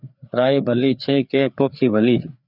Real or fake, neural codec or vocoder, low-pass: fake; codec, 16 kHz, 4 kbps, FreqCodec, larger model; 5.4 kHz